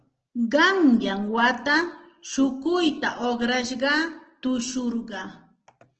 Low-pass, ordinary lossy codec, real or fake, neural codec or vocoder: 7.2 kHz; Opus, 16 kbps; real; none